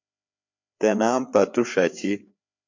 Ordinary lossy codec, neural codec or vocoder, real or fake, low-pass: MP3, 48 kbps; codec, 16 kHz, 4 kbps, FreqCodec, larger model; fake; 7.2 kHz